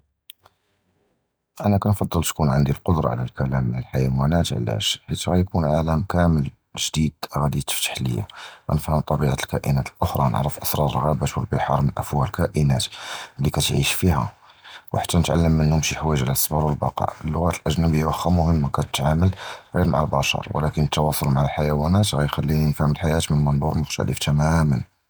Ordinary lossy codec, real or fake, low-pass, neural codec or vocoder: none; real; none; none